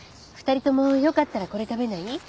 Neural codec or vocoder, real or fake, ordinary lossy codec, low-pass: none; real; none; none